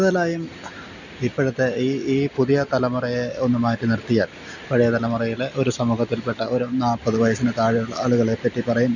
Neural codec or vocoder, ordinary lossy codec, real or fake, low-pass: none; none; real; 7.2 kHz